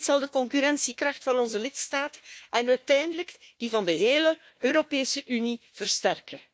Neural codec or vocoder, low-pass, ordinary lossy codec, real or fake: codec, 16 kHz, 1 kbps, FunCodec, trained on Chinese and English, 50 frames a second; none; none; fake